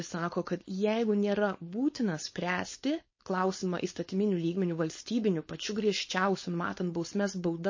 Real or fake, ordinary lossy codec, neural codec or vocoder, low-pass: fake; MP3, 32 kbps; codec, 16 kHz, 4.8 kbps, FACodec; 7.2 kHz